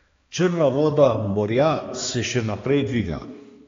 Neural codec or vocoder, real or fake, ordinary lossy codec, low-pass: codec, 16 kHz, 2 kbps, X-Codec, HuBERT features, trained on balanced general audio; fake; AAC, 32 kbps; 7.2 kHz